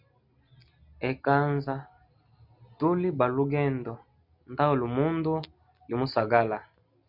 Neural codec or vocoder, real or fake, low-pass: none; real; 5.4 kHz